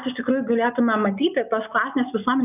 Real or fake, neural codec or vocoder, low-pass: real; none; 3.6 kHz